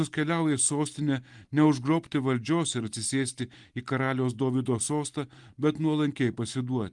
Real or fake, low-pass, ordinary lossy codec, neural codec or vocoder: real; 10.8 kHz; Opus, 24 kbps; none